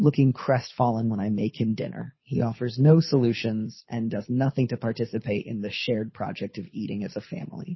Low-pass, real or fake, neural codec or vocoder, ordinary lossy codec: 7.2 kHz; fake; codec, 24 kHz, 6 kbps, HILCodec; MP3, 24 kbps